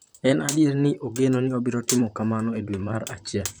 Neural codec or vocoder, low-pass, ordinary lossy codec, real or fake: vocoder, 44.1 kHz, 128 mel bands, Pupu-Vocoder; none; none; fake